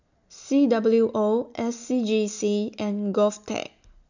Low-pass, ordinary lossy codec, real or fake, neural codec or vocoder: 7.2 kHz; none; real; none